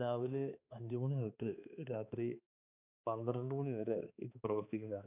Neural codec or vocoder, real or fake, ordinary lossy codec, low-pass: codec, 16 kHz, 2 kbps, X-Codec, HuBERT features, trained on balanced general audio; fake; none; 3.6 kHz